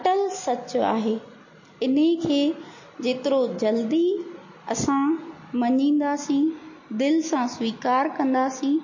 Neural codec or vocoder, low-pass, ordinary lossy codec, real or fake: none; 7.2 kHz; MP3, 32 kbps; real